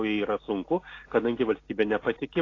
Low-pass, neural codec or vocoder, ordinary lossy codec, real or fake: 7.2 kHz; none; AAC, 32 kbps; real